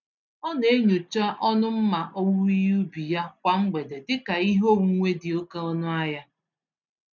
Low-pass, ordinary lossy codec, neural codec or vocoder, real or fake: 7.2 kHz; none; none; real